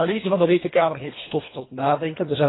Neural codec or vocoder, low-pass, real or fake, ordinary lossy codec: codec, 24 kHz, 1.5 kbps, HILCodec; 7.2 kHz; fake; AAC, 16 kbps